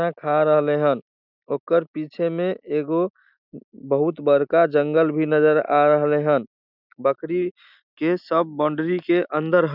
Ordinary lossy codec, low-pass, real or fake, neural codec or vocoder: none; 5.4 kHz; real; none